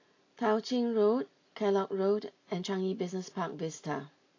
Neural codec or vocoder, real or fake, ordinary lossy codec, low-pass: none; real; AAC, 32 kbps; 7.2 kHz